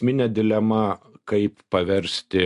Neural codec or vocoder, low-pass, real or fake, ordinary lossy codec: none; 10.8 kHz; real; AAC, 64 kbps